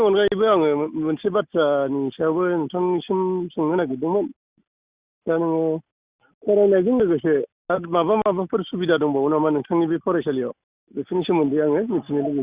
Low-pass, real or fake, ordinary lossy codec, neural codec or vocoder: 3.6 kHz; real; Opus, 64 kbps; none